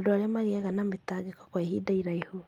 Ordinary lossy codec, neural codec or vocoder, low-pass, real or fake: Opus, 32 kbps; none; 19.8 kHz; real